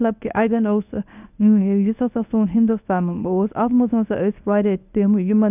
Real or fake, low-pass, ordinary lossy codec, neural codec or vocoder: fake; 3.6 kHz; none; codec, 24 kHz, 0.9 kbps, WavTokenizer, medium speech release version 1